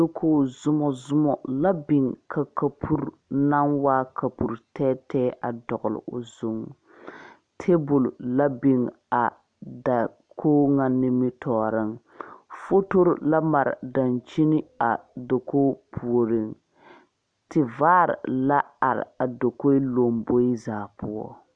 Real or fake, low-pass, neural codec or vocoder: real; 9.9 kHz; none